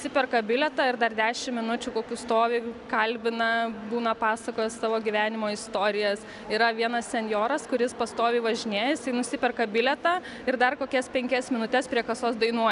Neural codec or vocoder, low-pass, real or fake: none; 10.8 kHz; real